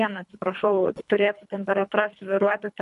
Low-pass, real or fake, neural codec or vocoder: 10.8 kHz; fake; codec, 24 kHz, 3 kbps, HILCodec